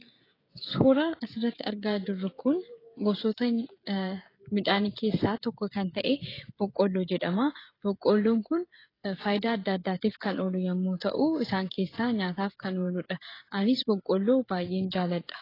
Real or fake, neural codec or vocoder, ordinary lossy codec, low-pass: fake; codec, 16 kHz, 16 kbps, FreqCodec, smaller model; AAC, 24 kbps; 5.4 kHz